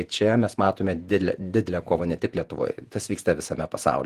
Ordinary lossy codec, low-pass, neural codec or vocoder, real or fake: Opus, 24 kbps; 14.4 kHz; vocoder, 48 kHz, 128 mel bands, Vocos; fake